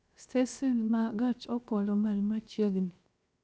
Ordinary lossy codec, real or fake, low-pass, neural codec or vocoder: none; fake; none; codec, 16 kHz, 0.7 kbps, FocalCodec